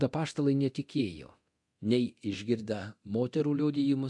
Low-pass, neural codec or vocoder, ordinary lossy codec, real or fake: 10.8 kHz; codec, 24 kHz, 0.9 kbps, DualCodec; MP3, 64 kbps; fake